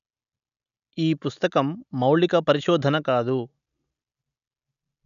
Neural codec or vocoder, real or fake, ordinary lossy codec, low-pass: none; real; none; 7.2 kHz